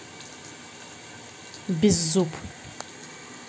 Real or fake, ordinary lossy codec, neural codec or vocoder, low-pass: real; none; none; none